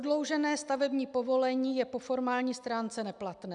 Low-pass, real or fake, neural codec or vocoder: 10.8 kHz; real; none